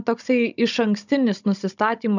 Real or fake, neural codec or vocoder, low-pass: fake; codec, 16 kHz, 16 kbps, FunCodec, trained on Chinese and English, 50 frames a second; 7.2 kHz